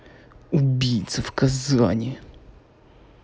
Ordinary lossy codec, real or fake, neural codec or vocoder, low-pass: none; real; none; none